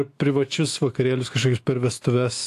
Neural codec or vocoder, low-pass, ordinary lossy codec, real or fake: autoencoder, 48 kHz, 128 numbers a frame, DAC-VAE, trained on Japanese speech; 14.4 kHz; AAC, 64 kbps; fake